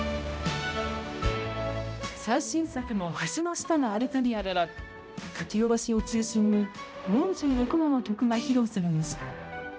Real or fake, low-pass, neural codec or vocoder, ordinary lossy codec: fake; none; codec, 16 kHz, 0.5 kbps, X-Codec, HuBERT features, trained on balanced general audio; none